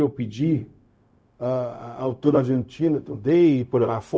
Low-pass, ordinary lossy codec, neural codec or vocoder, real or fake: none; none; codec, 16 kHz, 0.4 kbps, LongCat-Audio-Codec; fake